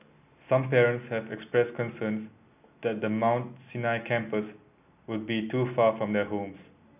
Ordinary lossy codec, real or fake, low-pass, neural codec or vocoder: none; real; 3.6 kHz; none